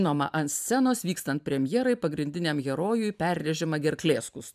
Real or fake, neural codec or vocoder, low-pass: fake; vocoder, 44.1 kHz, 128 mel bands every 512 samples, BigVGAN v2; 14.4 kHz